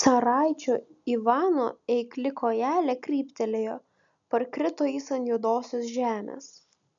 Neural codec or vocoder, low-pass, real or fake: none; 7.2 kHz; real